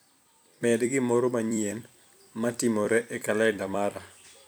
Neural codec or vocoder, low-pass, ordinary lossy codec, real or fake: vocoder, 44.1 kHz, 128 mel bands every 256 samples, BigVGAN v2; none; none; fake